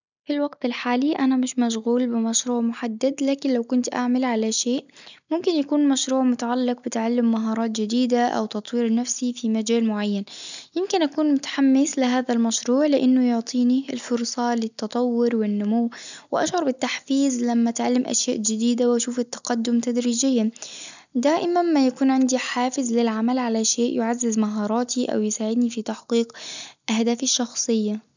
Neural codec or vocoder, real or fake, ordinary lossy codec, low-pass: none; real; none; 7.2 kHz